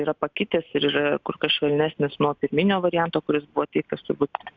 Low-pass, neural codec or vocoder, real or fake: 7.2 kHz; none; real